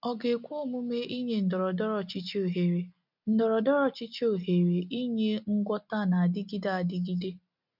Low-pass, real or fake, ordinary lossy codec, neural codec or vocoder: 5.4 kHz; real; none; none